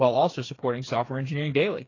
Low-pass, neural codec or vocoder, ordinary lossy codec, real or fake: 7.2 kHz; codec, 16 kHz, 4 kbps, FreqCodec, smaller model; AAC, 32 kbps; fake